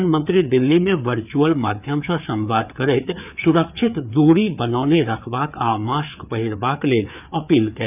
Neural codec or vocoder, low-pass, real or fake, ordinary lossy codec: codec, 16 kHz, 4 kbps, FreqCodec, larger model; 3.6 kHz; fake; none